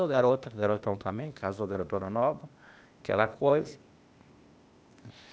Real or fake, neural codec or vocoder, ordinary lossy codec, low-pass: fake; codec, 16 kHz, 0.8 kbps, ZipCodec; none; none